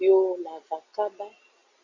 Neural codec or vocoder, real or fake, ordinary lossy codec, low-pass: none; real; Opus, 64 kbps; 7.2 kHz